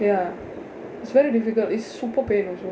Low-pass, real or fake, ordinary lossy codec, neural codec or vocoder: none; real; none; none